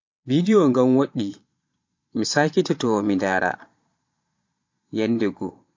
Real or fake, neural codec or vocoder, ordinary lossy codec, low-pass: fake; vocoder, 22.05 kHz, 80 mel bands, Vocos; MP3, 48 kbps; 7.2 kHz